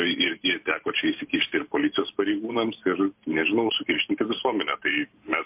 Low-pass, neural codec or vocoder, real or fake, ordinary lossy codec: 3.6 kHz; vocoder, 44.1 kHz, 128 mel bands every 512 samples, BigVGAN v2; fake; MP3, 32 kbps